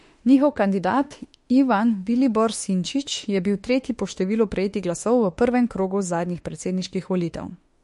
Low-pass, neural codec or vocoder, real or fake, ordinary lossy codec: 14.4 kHz; autoencoder, 48 kHz, 32 numbers a frame, DAC-VAE, trained on Japanese speech; fake; MP3, 48 kbps